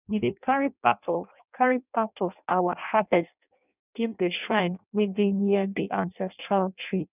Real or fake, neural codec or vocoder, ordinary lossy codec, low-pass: fake; codec, 16 kHz in and 24 kHz out, 0.6 kbps, FireRedTTS-2 codec; none; 3.6 kHz